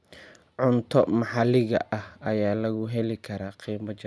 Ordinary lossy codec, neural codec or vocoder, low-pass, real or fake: none; none; none; real